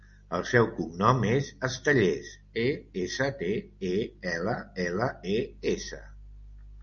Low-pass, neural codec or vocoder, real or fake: 7.2 kHz; none; real